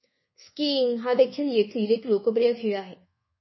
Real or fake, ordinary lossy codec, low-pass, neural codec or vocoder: fake; MP3, 24 kbps; 7.2 kHz; codec, 24 kHz, 1.2 kbps, DualCodec